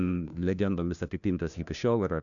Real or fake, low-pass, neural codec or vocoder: fake; 7.2 kHz; codec, 16 kHz, 1 kbps, FunCodec, trained on LibriTTS, 50 frames a second